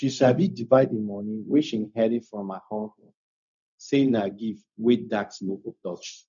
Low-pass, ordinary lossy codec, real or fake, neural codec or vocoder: 7.2 kHz; none; fake; codec, 16 kHz, 0.4 kbps, LongCat-Audio-Codec